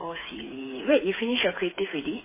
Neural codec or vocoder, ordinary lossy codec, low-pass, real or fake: codec, 16 kHz, 4 kbps, FreqCodec, smaller model; MP3, 16 kbps; 3.6 kHz; fake